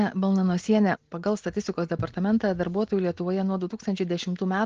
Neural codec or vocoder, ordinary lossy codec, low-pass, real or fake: none; Opus, 16 kbps; 7.2 kHz; real